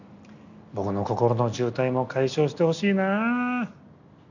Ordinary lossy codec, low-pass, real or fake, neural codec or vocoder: none; 7.2 kHz; real; none